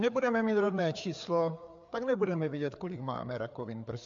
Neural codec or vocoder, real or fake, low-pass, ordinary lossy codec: codec, 16 kHz, 4 kbps, FreqCodec, larger model; fake; 7.2 kHz; MP3, 64 kbps